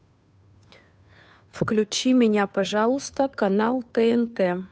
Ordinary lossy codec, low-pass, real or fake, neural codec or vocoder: none; none; fake; codec, 16 kHz, 2 kbps, FunCodec, trained on Chinese and English, 25 frames a second